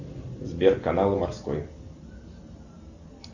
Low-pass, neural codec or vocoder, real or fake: 7.2 kHz; none; real